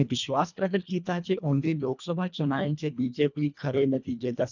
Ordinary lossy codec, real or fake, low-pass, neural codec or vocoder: none; fake; 7.2 kHz; codec, 24 kHz, 1.5 kbps, HILCodec